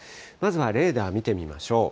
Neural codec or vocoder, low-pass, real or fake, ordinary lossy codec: none; none; real; none